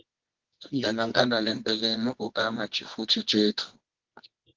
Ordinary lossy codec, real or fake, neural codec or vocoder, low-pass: Opus, 16 kbps; fake; codec, 24 kHz, 0.9 kbps, WavTokenizer, medium music audio release; 7.2 kHz